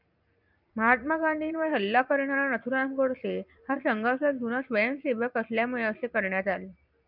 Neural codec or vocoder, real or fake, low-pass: vocoder, 44.1 kHz, 128 mel bands every 256 samples, BigVGAN v2; fake; 5.4 kHz